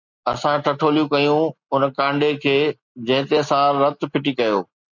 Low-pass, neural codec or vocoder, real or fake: 7.2 kHz; none; real